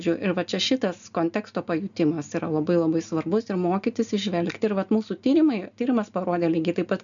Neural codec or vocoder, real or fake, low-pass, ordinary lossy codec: none; real; 7.2 kHz; MP3, 96 kbps